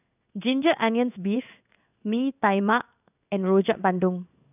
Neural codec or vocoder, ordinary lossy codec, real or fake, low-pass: codec, 16 kHz in and 24 kHz out, 1 kbps, XY-Tokenizer; none; fake; 3.6 kHz